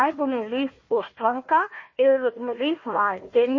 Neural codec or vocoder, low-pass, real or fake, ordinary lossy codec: codec, 16 kHz, 1 kbps, FunCodec, trained on Chinese and English, 50 frames a second; 7.2 kHz; fake; MP3, 32 kbps